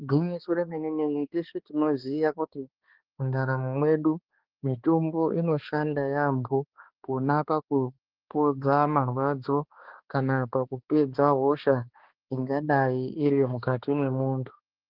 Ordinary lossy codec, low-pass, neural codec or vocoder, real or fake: Opus, 16 kbps; 5.4 kHz; codec, 16 kHz, 2 kbps, X-Codec, HuBERT features, trained on balanced general audio; fake